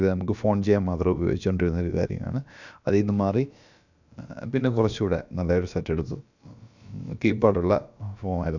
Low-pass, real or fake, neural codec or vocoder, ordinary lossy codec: 7.2 kHz; fake; codec, 16 kHz, about 1 kbps, DyCAST, with the encoder's durations; none